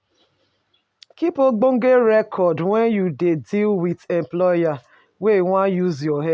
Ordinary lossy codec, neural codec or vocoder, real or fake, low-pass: none; none; real; none